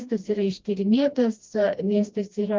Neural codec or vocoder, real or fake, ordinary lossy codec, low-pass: codec, 16 kHz, 1 kbps, FreqCodec, smaller model; fake; Opus, 32 kbps; 7.2 kHz